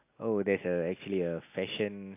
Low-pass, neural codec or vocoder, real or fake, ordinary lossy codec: 3.6 kHz; none; real; AAC, 24 kbps